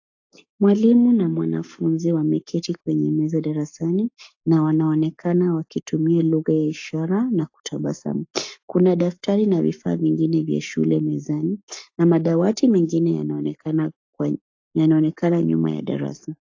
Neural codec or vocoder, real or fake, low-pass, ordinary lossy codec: codec, 44.1 kHz, 7.8 kbps, Pupu-Codec; fake; 7.2 kHz; AAC, 48 kbps